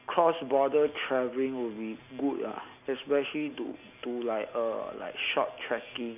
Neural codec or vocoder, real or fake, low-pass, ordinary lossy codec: none; real; 3.6 kHz; none